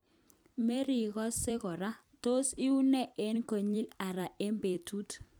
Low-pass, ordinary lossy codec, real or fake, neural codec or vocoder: none; none; fake; vocoder, 44.1 kHz, 128 mel bands every 256 samples, BigVGAN v2